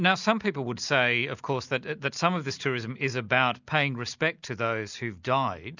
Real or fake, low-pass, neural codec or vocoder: real; 7.2 kHz; none